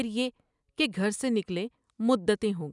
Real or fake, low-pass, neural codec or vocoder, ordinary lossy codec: real; 10.8 kHz; none; none